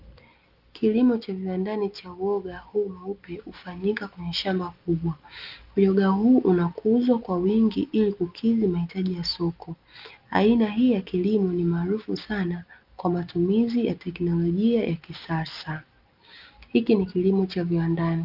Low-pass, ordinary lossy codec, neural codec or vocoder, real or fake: 5.4 kHz; Opus, 24 kbps; none; real